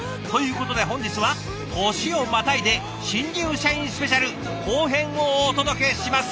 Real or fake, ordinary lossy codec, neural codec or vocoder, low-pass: real; none; none; none